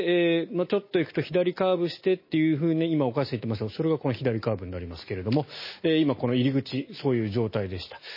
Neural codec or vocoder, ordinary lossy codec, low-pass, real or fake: none; MP3, 24 kbps; 5.4 kHz; real